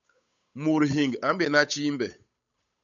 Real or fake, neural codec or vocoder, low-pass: fake; codec, 16 kHz, 8 kbps, FunCodec, trained on Chinese and English, 25 frames a second; 7.2 kHz